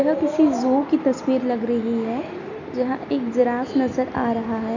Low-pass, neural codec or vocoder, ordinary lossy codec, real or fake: 7.2 kHz; none; none; real